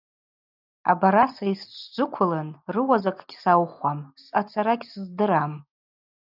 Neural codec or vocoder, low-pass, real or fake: none; 5.4 kHz; real